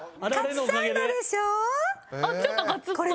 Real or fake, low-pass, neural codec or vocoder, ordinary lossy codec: real; none; none; none